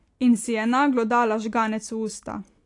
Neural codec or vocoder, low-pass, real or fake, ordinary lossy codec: none; 10.8 kHz; real; AAC, 48 kbps